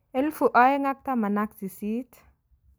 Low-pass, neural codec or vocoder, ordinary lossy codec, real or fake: none; none; none; real